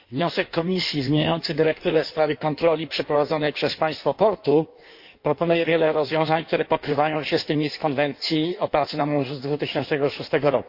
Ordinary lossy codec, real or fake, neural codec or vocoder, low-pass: MP3, 32 kbps; fake; codec, 16 kHz in and 24 kHz out, 1.1 kbps, FireRedTTS-2 codec; 5.4 kHz